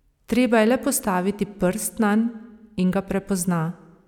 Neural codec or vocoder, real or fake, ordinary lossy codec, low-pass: none; real; none; 19.8 kHz